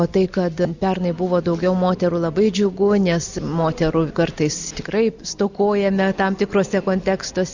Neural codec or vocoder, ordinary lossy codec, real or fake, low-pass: none; Opus, 64 kbps; real; 7.2 kHz